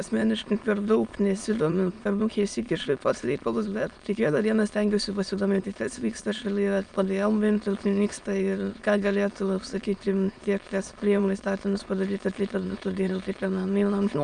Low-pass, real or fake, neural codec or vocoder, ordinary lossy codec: 9.9 kHz; fake; autoencoder, 22.05 kHz, a latent of 192 numbers a frame, VITS, trained on many speakers; Opus, 32 kbps